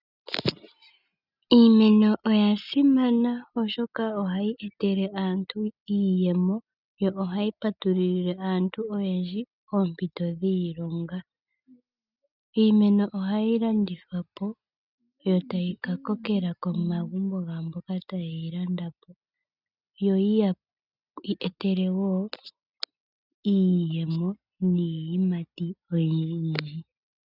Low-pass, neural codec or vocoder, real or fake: 5.4 kHz; none; real